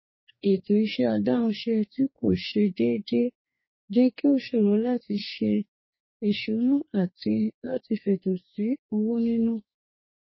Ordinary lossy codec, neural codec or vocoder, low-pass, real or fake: MP3, 24 kbps; codec, 44.1 kHz, 2.6 kbps, DAC; 7.2 kHz; fake